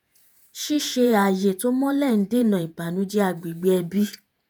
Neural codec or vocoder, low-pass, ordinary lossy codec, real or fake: vocoder, 48 kHz, 128 mel bands, Vocos; none; none; fake